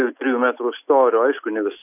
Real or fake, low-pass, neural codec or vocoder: real; 3.6 kHz; none